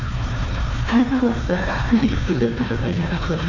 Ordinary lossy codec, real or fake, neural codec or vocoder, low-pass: none; fake; codec, 16 kHz, 1 kbps, FunCodec, trained on Chinese and English, 50 frames a second; 7.2 kHz